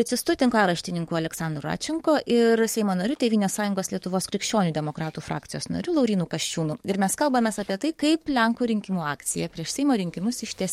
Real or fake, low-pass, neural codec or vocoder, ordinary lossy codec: fake; 19.8 kHz; codec, 44.1 kHz, 7.8 kbps, DAC; MP3, 64 kbps